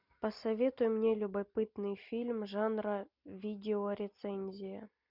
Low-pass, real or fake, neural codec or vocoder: 5.4 kHz; real; none